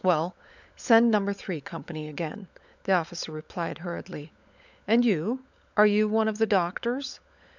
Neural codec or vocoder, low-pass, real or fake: codec, 16 kHz, 16 kbps, FunCodec, trained on LibriTTS, 50 frames a second; 7.2 kHz; fake